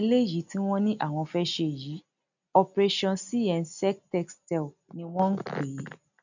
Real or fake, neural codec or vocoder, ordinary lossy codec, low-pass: real; none; none; 7.2 kHz